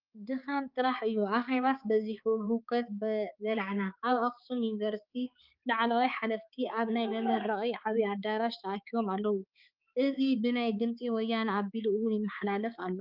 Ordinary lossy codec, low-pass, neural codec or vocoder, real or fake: Opus, 32 kbps; 5.4 kHz; codec, 16 kHz, 4 kbps, X-Codec, HuBERT features, trained on balanced general audio; fake